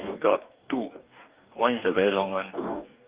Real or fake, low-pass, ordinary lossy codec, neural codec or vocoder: fake; 3.6 kHz; Opus, 32 kbps; codec, 44.1 kHz, 2.6 kbps, DAC